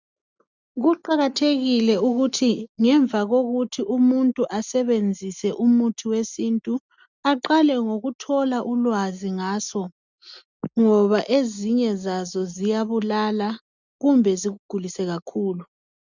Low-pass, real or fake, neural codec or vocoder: 7.2 kHz; real; none